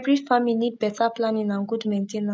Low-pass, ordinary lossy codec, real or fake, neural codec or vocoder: none; none; real; none